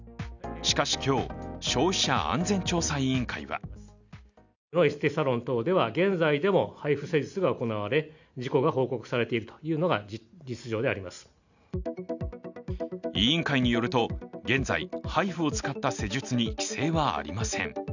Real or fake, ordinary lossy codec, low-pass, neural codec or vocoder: real; none; 7.2 kHz; none